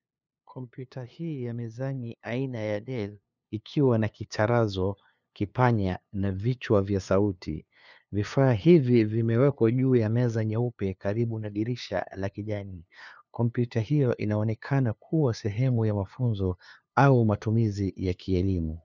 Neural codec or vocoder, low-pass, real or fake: codec, 16 kHz, 2 kbps, FunCodec, trained on LibriTTS, 25 frames a second; 7.2 kHz; fake